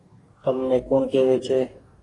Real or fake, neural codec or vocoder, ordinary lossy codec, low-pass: fake; codec, 44.1 kHz, 2.6 kbps, DAC; AAC, 32 kbps; 10.8 kHz